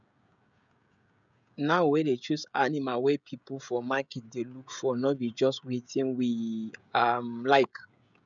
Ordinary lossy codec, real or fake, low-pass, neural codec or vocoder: none; fake; 7.2 kHz; codec, 16 kHz, 16 kbps, FreqCodec, smaller model